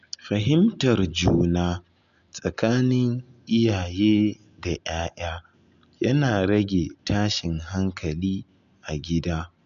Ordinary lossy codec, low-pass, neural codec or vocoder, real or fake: none; 7.2 kHz; none; real